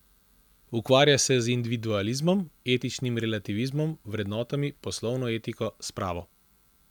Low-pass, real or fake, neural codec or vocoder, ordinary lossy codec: 19.8 kHz; real; none; none